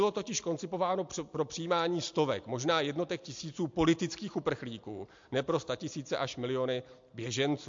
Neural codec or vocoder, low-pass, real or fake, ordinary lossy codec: none; 7.2 kHz; real; MP3, 48 kbps